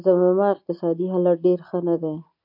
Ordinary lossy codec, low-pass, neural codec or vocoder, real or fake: AAC, 48 kbps; 5.4 kHz; none; real